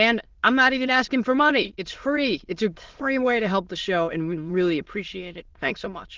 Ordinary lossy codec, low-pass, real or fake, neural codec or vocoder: Opus, 16 kbps; 7.2 kHz; fake; autoencoder, 22.05 kHz, a latent of 192 numbers a frame, VITS, trained on many speakers